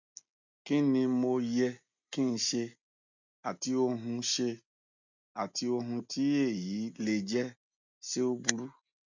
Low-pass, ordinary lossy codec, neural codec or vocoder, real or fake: 7.2 kHz; none; none; real